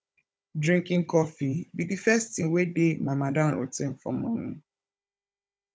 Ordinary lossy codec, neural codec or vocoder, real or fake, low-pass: none; codec, 16 kHz, 16 kbps, FunCodec, trained on Chinese and English, 50 frames a second; fake; none